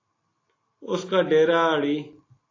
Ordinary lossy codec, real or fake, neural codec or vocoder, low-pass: AAC, 32 kbps; real; none; 7.2 kHz